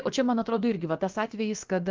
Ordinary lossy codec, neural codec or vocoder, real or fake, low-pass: Opus, 24 kbps; codec, 16 kHz, about 1 kbps, DyCAST, with the encoder's durations; fake; 7.2 kHz